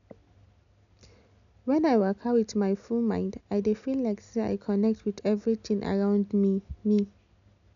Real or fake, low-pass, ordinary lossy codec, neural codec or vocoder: real; 7.2 kHz; none; none